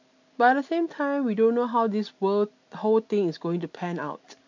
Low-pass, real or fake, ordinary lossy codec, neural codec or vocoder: 7.2 kHz; real; AAC, 48 kbps; none